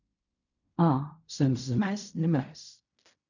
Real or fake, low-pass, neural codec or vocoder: fake; 7.2 kHz; codec, 16 kHz in and 24 kHz out, 0.4 kbps, LongCat-Audio-Codec, fine tuned four codebook decoder